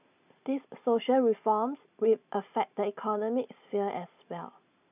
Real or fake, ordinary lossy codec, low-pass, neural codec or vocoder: real; none; 3.6 kHz; none